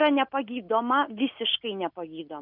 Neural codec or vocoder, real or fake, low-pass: none; real; 5.4 kHz